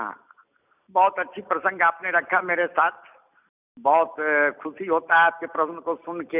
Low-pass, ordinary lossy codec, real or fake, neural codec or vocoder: 3.6 kHz; none; real; none